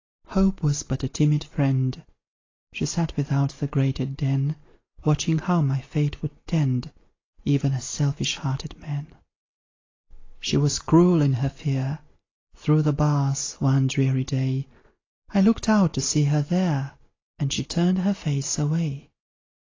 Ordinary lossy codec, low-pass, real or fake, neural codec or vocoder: AAC, 32 kbps; 7.2 kHz; real; none